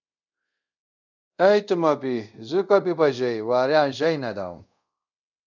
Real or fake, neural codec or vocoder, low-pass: fake; codec, 24 kHz, 0.5 kbps, DualCodec; 7.2 kHz